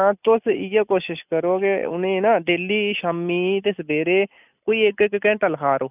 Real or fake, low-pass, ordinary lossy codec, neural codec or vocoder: real; 3.6 kHz; none; none